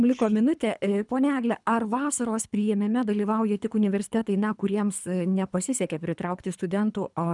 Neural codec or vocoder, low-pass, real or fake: codec, 24 kHz, 3 kbps, HILCodec; 10.8 kHz; fake